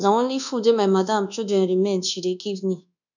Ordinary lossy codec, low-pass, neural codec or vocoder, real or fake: none; 7.2 kHz; codec, 24 kHz, 1.2 kbps, DualCodec; fake